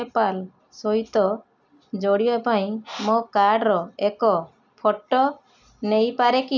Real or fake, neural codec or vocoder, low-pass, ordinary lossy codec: real; none; 7.2 kHz; none